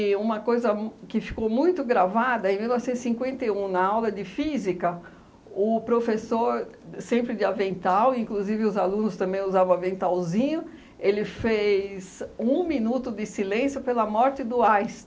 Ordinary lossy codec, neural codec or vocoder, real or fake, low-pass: none; none; real; none